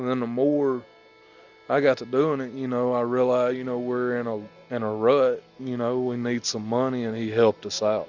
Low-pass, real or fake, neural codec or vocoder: 7.2 kHz; real; none